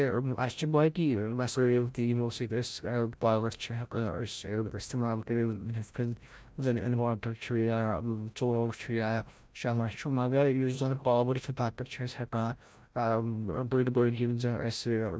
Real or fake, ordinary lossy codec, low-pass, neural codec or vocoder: fake; none; none; codec, 16 kHz, 0.5 kbps, FreqCodec, larger model